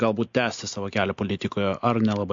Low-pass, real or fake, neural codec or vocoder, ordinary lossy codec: 7.2 kHz; real; none; MP3, 48 kbps